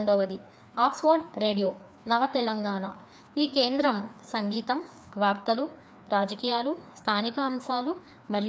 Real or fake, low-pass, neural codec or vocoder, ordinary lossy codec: fake; none; codec, 16 kHz, 2 kbps, FreqCodec, larger model; none